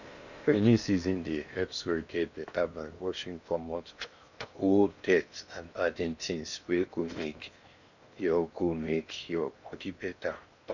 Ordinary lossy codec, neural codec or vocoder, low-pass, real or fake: none; codec, 16 kHz in and 24 kHz out, 0.8 kbps, FocalCodec, streaming, 65536 codes; 7.2 kHz; fake